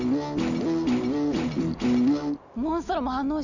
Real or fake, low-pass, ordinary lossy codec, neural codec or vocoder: real; 7.2 kHz; none; none